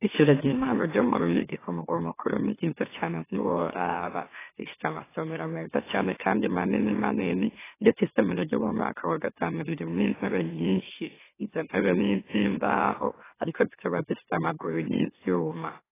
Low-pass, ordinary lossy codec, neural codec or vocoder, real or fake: 3.6 kHz; AAC, 16 kbps; autoencoder, 44.1 kHz, a latent of 192 numbers a frame, MeloTTS; fake